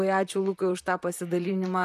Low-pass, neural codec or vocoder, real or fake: 14.4 kHz; vocoder, 44.1 kHz, 128 mel bands, Pupu-Vocoder; fake